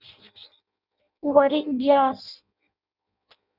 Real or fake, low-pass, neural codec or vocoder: fake; 5.4 kHz; codec, 16 kHz in and 24 kHz out, 0.6 kbps, FireRedTTS-2 codec